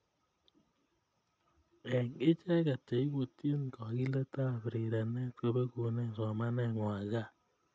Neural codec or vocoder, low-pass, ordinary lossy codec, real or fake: none; none; none; real